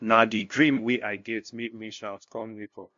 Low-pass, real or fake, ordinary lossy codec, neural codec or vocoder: 7.2 kHz; fake; MP3, 48 kbps; codec, 16 kHz, 0.5 kbps, FunCodec, trained on LibriTTS, 25 frames a second